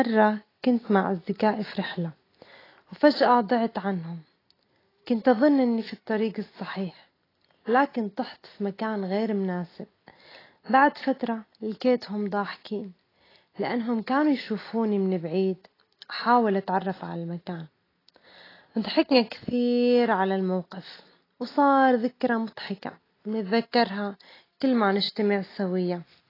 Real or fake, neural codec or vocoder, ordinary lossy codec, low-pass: real; none; AAC, 24 kbps; 5.4 kHz